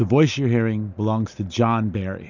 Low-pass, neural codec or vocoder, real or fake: 7.2 kHz; none; real